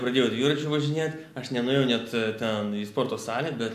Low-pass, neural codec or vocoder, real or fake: 14.4 kHz; none; real